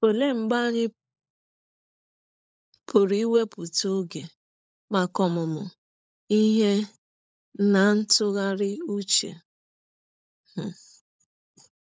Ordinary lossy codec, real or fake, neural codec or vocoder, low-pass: none; fake; codec, 16 kHz, 16 kbps, FunCodec, trained on LibriTTS, 50 frames a second; none